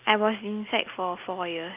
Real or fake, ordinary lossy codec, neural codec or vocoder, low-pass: real; Opus, 32 kbps; none; 3.6 kHz